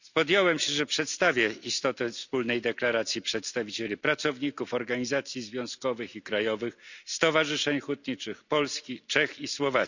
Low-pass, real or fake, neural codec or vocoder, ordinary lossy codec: 7.2 kHz; real; none; none